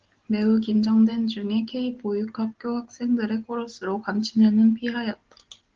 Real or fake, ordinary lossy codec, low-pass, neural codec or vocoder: real; Opus, 16 kbps; 7.2 kHz; none